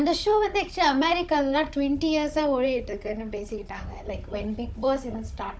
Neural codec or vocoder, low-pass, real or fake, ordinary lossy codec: codec, 16 kHz, 16 kbps, FunCodec, trained on Chinese and English, 50 frames a second; none; fake; none